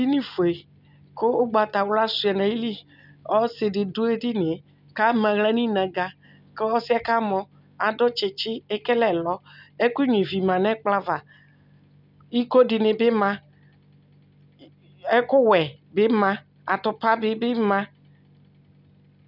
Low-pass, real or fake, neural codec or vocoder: 5.4 kHz; real; none